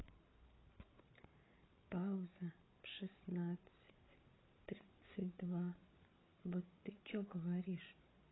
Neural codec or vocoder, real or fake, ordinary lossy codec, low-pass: codec, 16 kHz, 8 kbps, FreqCodec, larger model; fake; AAC, 16 kbps; 7.2 kHz